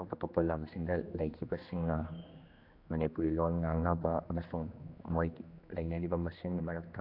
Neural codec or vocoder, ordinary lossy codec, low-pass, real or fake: codec, 16 kHz, 2 kbps, X-Codec, HuBERT features, trained on general audio; AAC, 32 kbps; 5.4 kHz; fake